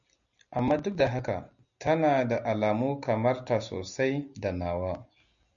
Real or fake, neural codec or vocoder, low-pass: real; none; 7.2 kHz